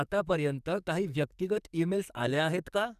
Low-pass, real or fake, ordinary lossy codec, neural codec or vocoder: 14.4 kHz; fake; none; codec, 44.1 kHz, 2.6 kbps, SNAC